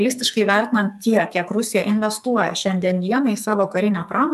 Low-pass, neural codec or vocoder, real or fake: 14.4 kHz; codec, 44.1 kHz, 2.6 kbps, SNAC; fake